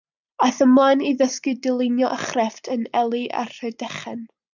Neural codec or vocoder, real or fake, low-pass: none; real; 7.2 kHz